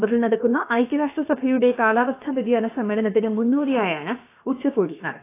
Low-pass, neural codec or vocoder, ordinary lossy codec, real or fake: 3.6 kHz; codec, 16 kHz, about 1 kbps, DyCAST, with the encoder's durations; AAC, 24 kbps; fake